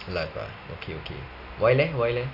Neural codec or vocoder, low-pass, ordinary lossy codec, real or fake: none; 5.4 kHz; none; real